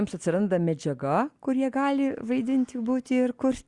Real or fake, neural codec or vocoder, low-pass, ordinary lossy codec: real; none; 10.8 kHz; Opus, 64 kbps